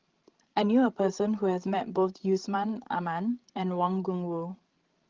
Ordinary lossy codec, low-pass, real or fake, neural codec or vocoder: Opus, 16 kbps; 7.2 kHz; fake; codec, 16 kHz, 16 kbps, FreqCodec, larger model